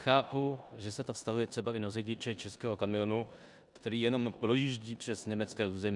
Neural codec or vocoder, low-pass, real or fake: codec, 16 kHz in and 24 kHz out, 0.9 kbps, LongCat-Audio-Codec, four codebook decoder; 10.8 kHz; fake